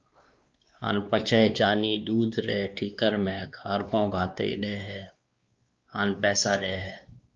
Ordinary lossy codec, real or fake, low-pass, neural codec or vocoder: Opus, 24 kbps; fake; 7.2 kHz; codec, 16 kHz, 2 kbps, X-Codec, WavLM features, trained on Multilingual LibriSpeech